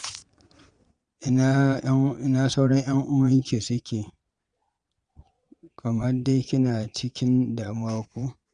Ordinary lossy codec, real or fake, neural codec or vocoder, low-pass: Opus, 64 kbps; fake; vocoder, 22.05 kHz, 80 mel bands, Vocos; 9.9 kHz